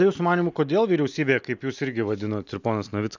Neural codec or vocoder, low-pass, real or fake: none; 7.2 kHz; real